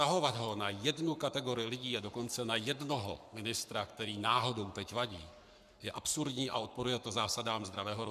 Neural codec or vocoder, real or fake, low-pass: codec, 44.1 kHz, 7.8 kbps, Pupu-Codec; fake; 14.4 kHz